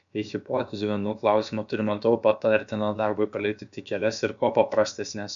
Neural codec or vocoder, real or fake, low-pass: codec, 16 kHz, about 1 kbps, DyCAST, with the encoder's durations; fake; 7.2 kHz